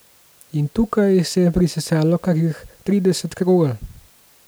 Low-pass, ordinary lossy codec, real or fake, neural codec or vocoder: none; none; real; none